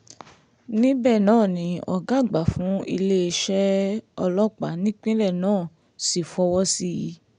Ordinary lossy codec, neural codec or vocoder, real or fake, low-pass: none; none; real; 9.9 kHz